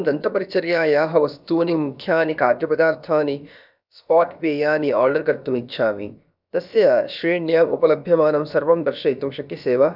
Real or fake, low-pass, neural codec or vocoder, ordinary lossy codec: fake; 5.4 kHz; codec, 16 kHz, about 1 kbps, DyCAST, with the encoder's durations; none